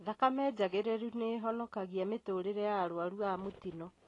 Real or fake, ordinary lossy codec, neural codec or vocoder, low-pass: real; AAC, 32 kbps; none; 10.8 kHz